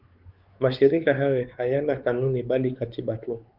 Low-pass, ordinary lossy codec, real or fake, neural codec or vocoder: 5.4 kHz; Opus, 32 kbps; fake; codec, 16 kHz, 4 kbps, X-Codec, WavLM features, trained on Multilingual LibriSpeech